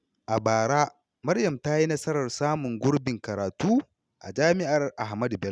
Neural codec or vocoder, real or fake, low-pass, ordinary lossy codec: none; real; none; none